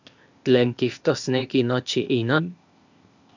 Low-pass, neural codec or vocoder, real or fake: 7.2 kHz; codec, 16 kHz, 0.8 kbps, ZipCodec; fake